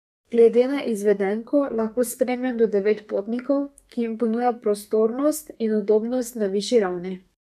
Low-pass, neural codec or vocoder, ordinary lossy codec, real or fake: 14.4 kHz; codec, 32 kHz, 1.9 kbps, SNAC; none; fake